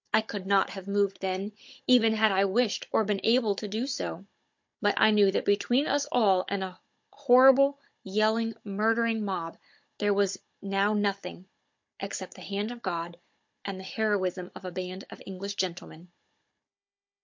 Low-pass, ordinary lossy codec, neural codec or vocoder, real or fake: 7.2 kHz; MP3, 48 kbps; codec, 16 kHz, 16 kbps, FunCodec, trained on Chinese and English, 50 frames a second; fake